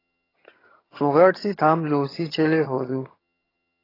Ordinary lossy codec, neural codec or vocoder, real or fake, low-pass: AAC, 24 kbps; vocoder, 22.05 kHz, 80 mel bands, HiFi-GAN; fake; 5.4 kHz